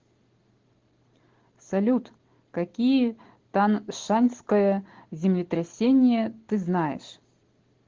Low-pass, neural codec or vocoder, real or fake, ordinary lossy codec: 7.2 kHz; none; real; Opus, 16 kbps